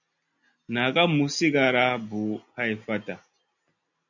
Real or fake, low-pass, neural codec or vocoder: real; 7.2 kHz; none